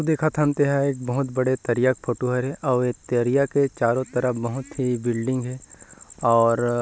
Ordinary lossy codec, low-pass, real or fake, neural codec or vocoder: none; none; real; none